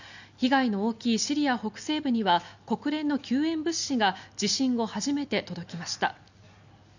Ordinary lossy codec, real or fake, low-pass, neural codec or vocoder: none; real; 7.2 kHz; none